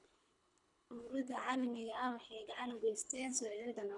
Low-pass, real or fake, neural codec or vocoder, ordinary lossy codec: 10.8 kHz; fake; codec, 24 kHz, 3 kbps, HILCodec; none